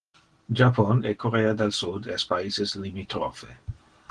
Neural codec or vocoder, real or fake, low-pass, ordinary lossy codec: none; real; 9.9 kHz; Opus, 16 kbps